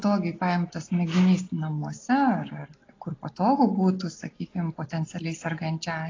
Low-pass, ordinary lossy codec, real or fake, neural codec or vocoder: 7.2 kHz; AAC, 32 kbps; real; none